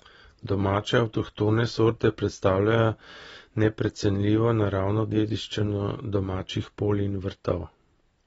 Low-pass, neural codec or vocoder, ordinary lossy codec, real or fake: 19.8 kHz; none; AAC, 24 kbps; real